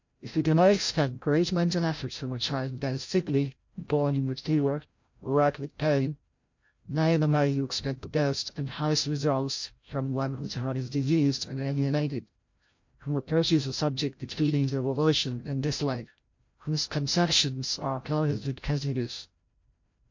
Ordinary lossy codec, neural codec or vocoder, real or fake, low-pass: MP3, 48 kbps; codec, 16 kHz, 0.5 kbps, FreqCodec, larger model; fake; 7.2 kHz